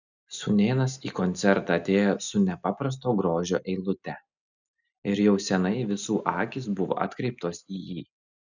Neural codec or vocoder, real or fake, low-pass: none; real; 7.2 kHz